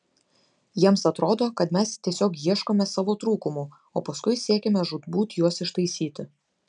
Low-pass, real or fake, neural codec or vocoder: 9.9 kHz; real; none